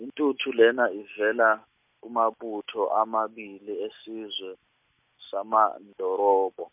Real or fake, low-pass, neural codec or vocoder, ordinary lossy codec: real; 3.6 kHz; none; MP3, 32 kbps